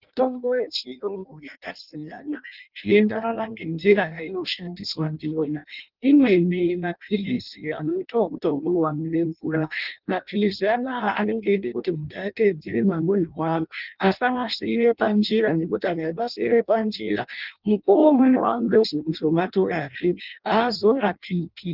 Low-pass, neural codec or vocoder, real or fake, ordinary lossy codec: 5.4 kHz; codec, 16 kHz in and 24 kHz out, 0.6 kbps, FireRedTTS-2 codec; fake; Opus, 32 kbps